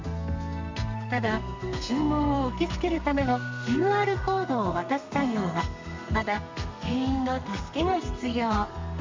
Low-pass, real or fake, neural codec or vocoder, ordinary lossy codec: 7.2 kHz; fake; codec, 32 kHz, 1.9 kbps, SNAC; MP3, 64 kbps